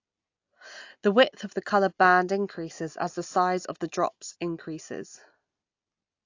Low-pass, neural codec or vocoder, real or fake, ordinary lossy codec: 7.2 kHz; none; real; AAC, 48 kbps